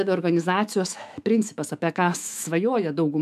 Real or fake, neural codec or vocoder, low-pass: fake; autoencoder, 48 kHz, 128 numbers a frame, DAC-VAE, trained on Japanese speech; 14.4 kHz